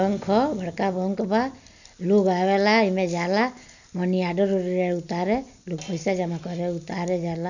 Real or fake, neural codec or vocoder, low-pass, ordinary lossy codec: real; none; 7.2 kHz; none